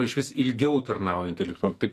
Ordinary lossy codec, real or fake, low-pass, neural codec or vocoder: AAC, 64 kbps; fake; 14.4 kHz; codec, 44.1 kHz, 2.6 kbps, SNAC